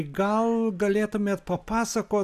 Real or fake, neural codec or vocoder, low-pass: real; none; 14.4 kHz